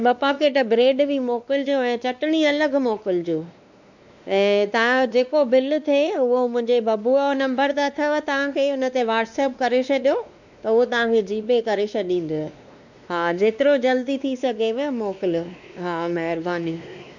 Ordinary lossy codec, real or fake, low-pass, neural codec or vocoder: none; fake; 7.2 kHz; codec, 16 kHz, 2 kbps, X-Codec, WavLM features, trained on Multilingual LibriSpeech